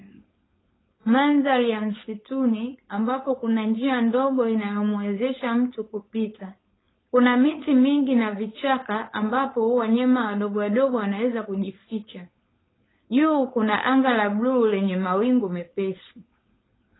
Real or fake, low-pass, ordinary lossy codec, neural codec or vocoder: fake; 7.2 kHz; AAC, 16 kbps; codec, 16 kHz, 4.8 kbps, FACodec